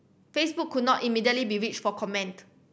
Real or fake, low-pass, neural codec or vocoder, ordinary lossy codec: real; none; none; none